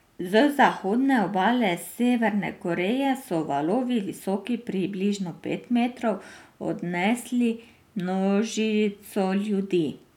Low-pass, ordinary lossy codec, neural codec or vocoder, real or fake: 19.8 kHz; none; none; real